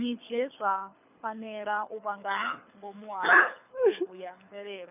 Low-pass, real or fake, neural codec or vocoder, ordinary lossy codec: 3.6 kHz; fake; codec, 24 kHz, 6 kbps, HILCodec; none